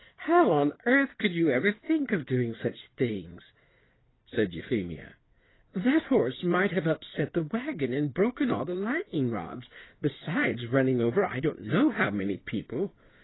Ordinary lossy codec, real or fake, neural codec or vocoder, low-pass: AAC, 16 kbps; fake; codec, 16 kHz in and 24 kHz out, 2.2 kbps, FireRedTTS-2 codec; 7.2 kHz